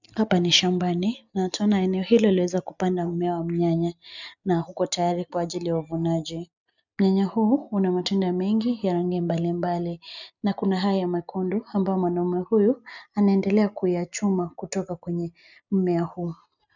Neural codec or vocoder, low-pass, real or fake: none; 7.2 kHz; real